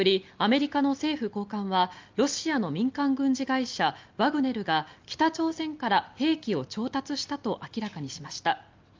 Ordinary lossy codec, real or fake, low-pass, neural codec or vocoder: Opus, 24 kbps; real; 7.2 kHz; none